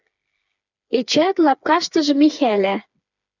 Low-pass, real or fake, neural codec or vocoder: 7.2 kHz; fake; codec, 16 kHz, 4 kbps, FreqCodec, smaller model